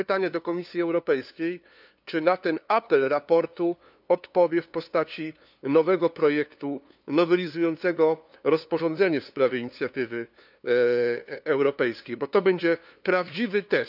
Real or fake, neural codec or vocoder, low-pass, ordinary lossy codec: fake; codec, 16 kHz, 2 kbps, FunCodec, trained on LibriTTS, 25 frames a second; 5.4 kHz; none